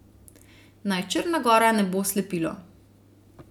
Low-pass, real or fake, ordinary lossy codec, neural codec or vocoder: 19.8 kHz; real; none; none